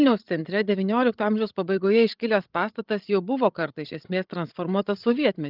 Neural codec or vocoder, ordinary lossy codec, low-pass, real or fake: codec, 16 kHz, 16 kbps, FreqCodec, larger model; Opus, 32 kbps; 5.4 kHz; fake